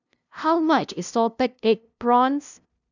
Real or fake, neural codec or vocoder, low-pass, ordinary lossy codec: fake; codec, 16 kHz, 0.5 kbps, FunCodec, trained on LibriTTS, 25 frames a second; 7.2 kHz; none